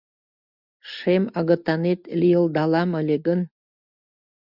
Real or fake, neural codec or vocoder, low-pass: real; none; 5.4 kHz